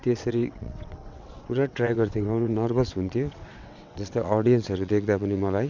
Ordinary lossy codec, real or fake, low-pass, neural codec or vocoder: none; fake; 7.2 kHz; vocoder, 22.05 kHz, 80 mel bands, WaveNeXt